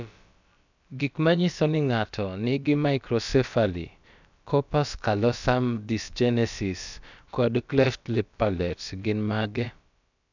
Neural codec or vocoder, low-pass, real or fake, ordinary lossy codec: codec, 16 kHz, about 1 kbps, DyCAST, with the encoder's durations; 7.2 kHz; fake; none